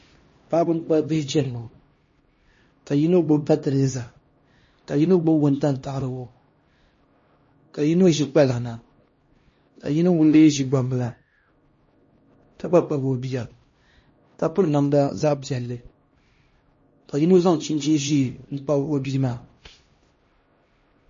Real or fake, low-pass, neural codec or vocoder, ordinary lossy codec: fake; 7.2 kHz; codec, 16 kHz, 1 kbps, X-Codec, HuBERT features, trained on LibriSpeech; MP3, 32 kbps